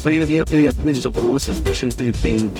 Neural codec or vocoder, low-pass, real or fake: codec, 44.1 kHz, 0.9 kbps, DAC; 19.8 kHz; fake